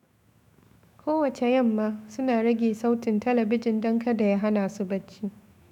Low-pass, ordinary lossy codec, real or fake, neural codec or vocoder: 19.8 kHz; none; fake; autoencoder, 48 kHz, 128 numbers a frame, DAC-VAE, trained on Japanese speech